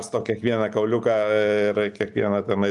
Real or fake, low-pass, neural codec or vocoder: real; 10.8 kHz; none